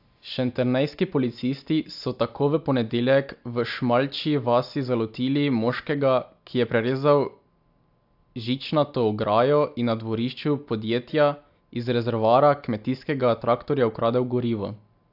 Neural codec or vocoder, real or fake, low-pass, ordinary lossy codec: none; real; 5.4 kHz; AAC, 48 kbps